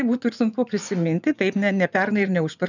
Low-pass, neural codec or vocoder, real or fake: 7.2 kHz; vocoder, 24 kHz, 100 mel bands, Vocos; fake